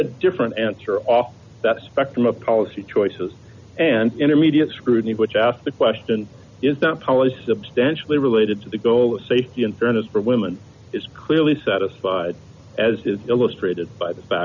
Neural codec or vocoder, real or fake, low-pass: none; real; 7.2 kHz